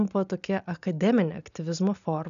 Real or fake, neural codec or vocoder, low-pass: real; none; 7.2 kHz